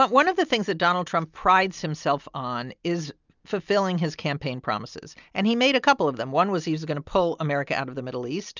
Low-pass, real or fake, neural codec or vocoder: 7.2 kHz; real; none